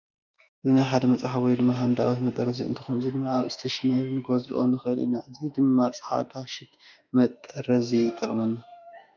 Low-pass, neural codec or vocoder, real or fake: 7.2 kHz; autoencoder, 48 kHz, 32 numbers a frame, DAC-VAE, trained on Japanese speech; fake